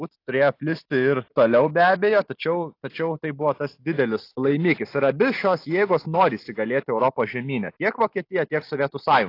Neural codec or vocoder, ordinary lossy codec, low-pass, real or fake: none; AAC, 32 kbps; 5.4 kHz; real